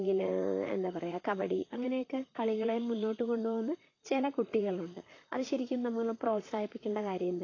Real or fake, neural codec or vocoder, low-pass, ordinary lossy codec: fake; vocoder, 44.1 kHz, 128 mel bands, Pupu-Vocoder; 7.2 kHz; AAC, 32 kbps